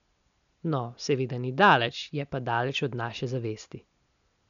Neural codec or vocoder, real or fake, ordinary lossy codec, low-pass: none; real; none; 7.2 kHz